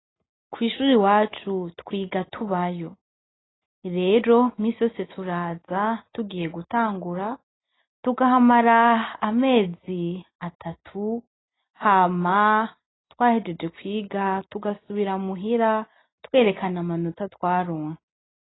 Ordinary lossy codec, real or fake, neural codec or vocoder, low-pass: AAC, 16 kbps; real; none; 7.2 kHz